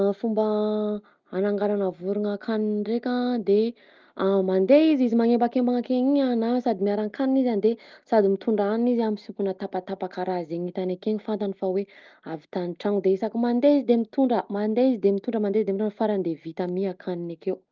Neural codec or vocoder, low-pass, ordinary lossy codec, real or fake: none; 7.2 kHz; Opus, 32 kbps; real